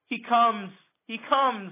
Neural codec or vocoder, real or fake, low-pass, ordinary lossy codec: none; real; 3.6 kHz; AAC, 24 kbps